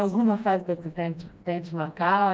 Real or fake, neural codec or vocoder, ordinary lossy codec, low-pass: fake; codec, 16 kHz, 1 kbps, FreqCodec, smaller model; none; none